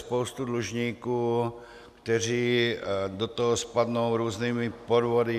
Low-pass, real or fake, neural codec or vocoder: 14.4 kHz; real; none